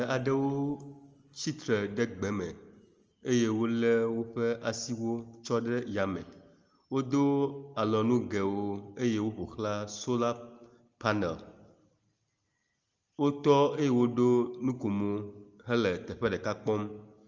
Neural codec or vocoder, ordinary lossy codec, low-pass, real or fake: none; Opus, 24 kbps; 7.2 kHz; real